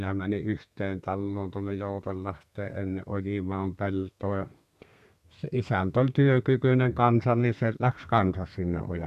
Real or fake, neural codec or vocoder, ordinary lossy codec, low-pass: fake; codec, 32 kHz, 1.9 kbps, SNAC; none; 14.4 kHz